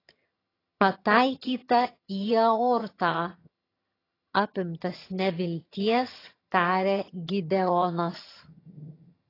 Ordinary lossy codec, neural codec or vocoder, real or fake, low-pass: AAC, 24 kbps; vocoder, 22.05 kHz, 80 mel bands, HiFi-GAN; fake; 5.4 kHz